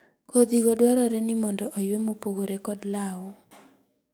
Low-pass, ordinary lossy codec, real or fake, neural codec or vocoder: none; none; fake; codec, 44.1 kHz, 7.8 kbps, DAC